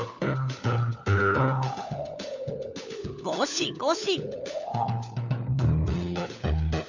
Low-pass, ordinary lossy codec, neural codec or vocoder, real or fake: 7.2 kHz; none; codec, 16 kHz, 4 kbps, FunCodec, trained on LibriTTS, 50 frames a second; fake